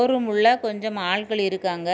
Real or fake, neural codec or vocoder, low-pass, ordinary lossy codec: real; none; none; none